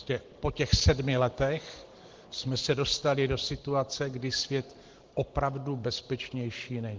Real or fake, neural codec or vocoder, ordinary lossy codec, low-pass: fake; vocoder, 44.1 kHz, 128 mel bands every 512 samples, BigVGAN v2; Opus, 16 kbps; 7.2 kHz